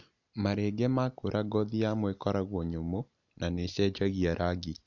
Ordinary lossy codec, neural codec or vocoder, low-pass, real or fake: none; none; 7.2 kHz; real